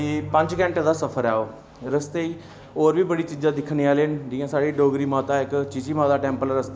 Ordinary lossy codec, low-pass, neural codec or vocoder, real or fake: none; none; none; real